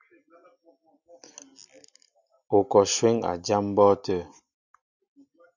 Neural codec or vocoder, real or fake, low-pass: none; real; 7.2 kHz